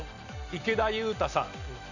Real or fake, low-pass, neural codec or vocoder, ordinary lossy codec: real; 7.2 kHz; none; none